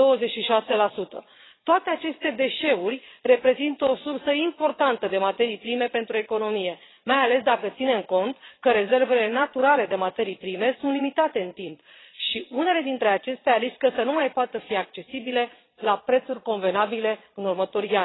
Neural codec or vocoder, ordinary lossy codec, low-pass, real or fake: vocoder, 22.05 kHz, 80 mel bands, Vocos; AAC, 16 kbps; 7.2 kHz; fake